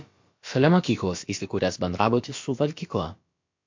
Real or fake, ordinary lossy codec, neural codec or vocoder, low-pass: fake; MP3, 48 kbps; codec, 16 kHz, about 1 kbps, DyCAST, with the encoder's durations; 7.2 kHz